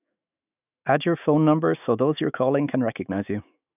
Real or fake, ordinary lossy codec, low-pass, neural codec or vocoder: fake; none; 3.6 kHz; autoencoder, 48 kHz, 128 numbers a frame, DAC-VAE, trained on Japanese speech